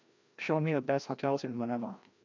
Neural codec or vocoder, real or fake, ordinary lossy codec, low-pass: codec, 16 kHz, 1 kbps, FreqCodec, larger model; fake; MP3, 64 kbps; 7.2 kHz